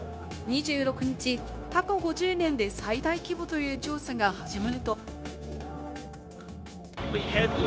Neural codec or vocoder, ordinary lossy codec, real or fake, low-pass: codec, 16 kHz, 0.9 kbps, LongCat-Audio-Codec; none; fake; none